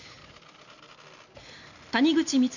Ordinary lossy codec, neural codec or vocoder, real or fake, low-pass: none; none; real; 7.2 kHz